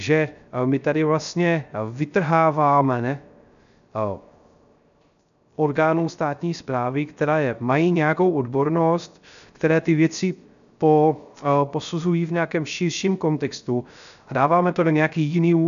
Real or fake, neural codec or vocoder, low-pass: fake; codec, 16 kHz, 0.3 kbps, FocalCodec; 7.2 kHz